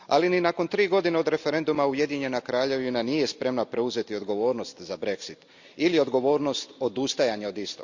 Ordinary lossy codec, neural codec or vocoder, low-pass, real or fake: Opus, 64 kbps; none; 7.2 kHz; real